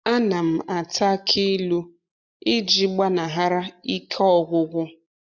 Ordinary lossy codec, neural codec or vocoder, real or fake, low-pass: none; none; real; 7.2 kHz